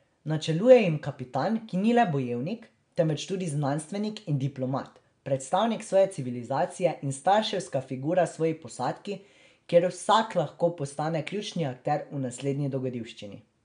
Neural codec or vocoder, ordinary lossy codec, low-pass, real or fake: none; MP3, 64 kbps; 9.9 kHz; real